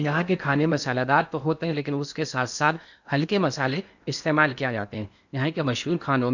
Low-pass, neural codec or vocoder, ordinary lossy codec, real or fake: 7.2 kHz; codec, 16 kHz in and 24 kHz out, 0.8 kbps, FocalCodec, streaming, 65536 codes; none; fake